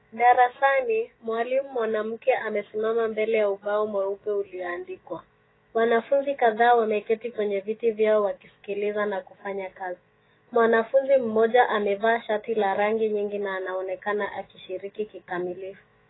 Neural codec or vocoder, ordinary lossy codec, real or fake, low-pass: autoencoder, 48 kHz, 128 numbers a frame, DAC-VAE, trained on Japanese speech; AAC, 16 kbps; fake; 7.2 kHz